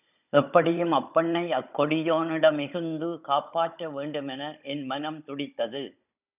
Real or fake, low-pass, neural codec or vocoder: fake; 3.6 kHz; codec, 16 kHz, 16 kbps, FunCodec, trained on Chinese and English, 50 frames a second